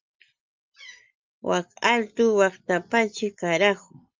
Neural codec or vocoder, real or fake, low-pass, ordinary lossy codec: none; real; 7.2 kHz; Opus, 24 kbps